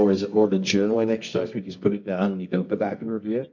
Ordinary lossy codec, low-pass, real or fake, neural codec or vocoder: MP3, 48 kbps; 7.2 kHz; fake; codec, 24 kHz, 0.9 kbps, WavTokenizer, medium music audio release